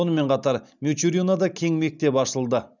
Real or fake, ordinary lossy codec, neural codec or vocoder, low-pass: real; none; none; 7.2 kHz